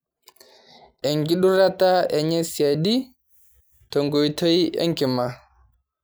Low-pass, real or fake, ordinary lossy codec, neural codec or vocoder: none; real; none; none